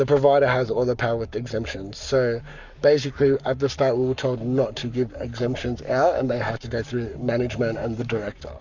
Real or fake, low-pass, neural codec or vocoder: fake; 7.2 kHz; codec, 44.1 kHz, 7.8 kbps, Pupu-Codec